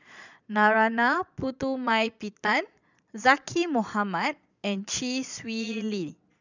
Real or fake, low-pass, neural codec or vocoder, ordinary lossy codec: fake; 7.2 kHz; vocoder, 22.05 kHz, 80 mel bands, Vocos; none